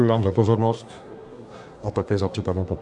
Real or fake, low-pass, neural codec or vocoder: fake; 10.8 kHz; codec, 24 kHz, 1 kbps, SNAC